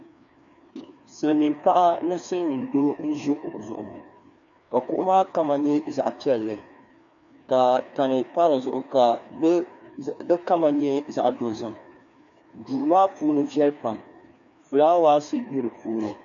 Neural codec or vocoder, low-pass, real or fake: codec, 16 kHz, 2 kbps, FreqCodec, larger model; 7.2 kHz; fake